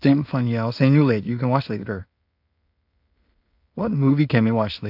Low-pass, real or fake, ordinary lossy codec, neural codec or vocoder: 5.4 kHz; fake; AAC, 48 kbps; codec, 16 kHz in and 24 kHz out, 0.4 kbps, LongCat-Audio-Codec, two codebook decoder